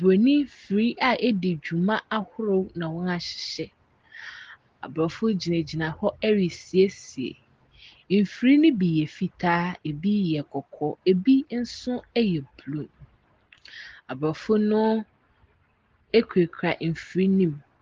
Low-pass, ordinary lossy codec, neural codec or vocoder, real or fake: 7.2 kHz; Opus, 16 kbps; none; real